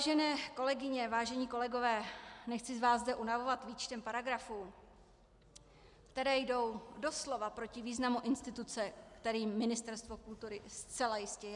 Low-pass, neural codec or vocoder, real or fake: 10.8 kHz; none; real